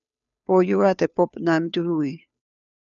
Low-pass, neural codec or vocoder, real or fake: 7.2 kHz; codec, 16 kHz, 2 kbps, FunCodec, trained on Chinese and English, 25 frames a second; fake